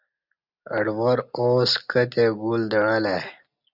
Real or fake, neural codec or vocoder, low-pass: real; none; 5.4 kHz